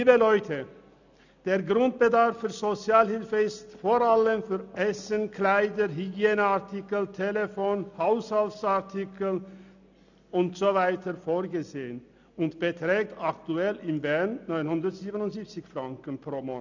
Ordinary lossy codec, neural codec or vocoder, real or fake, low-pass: none; none; real; 7.2 kHz